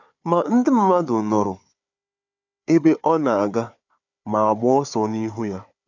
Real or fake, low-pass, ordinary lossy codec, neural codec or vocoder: fake; 7.2 kHz; none; codec, 16 kHz, 4 kbps, FunCodec, trained on Chinese and English, 50 frames a second